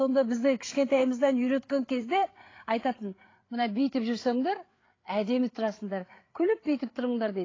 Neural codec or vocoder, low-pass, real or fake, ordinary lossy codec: codec, 16 kHz, 8 kbps, FreqCodec, larger model; 7.2 kHz; fake; AAC, 32 kbps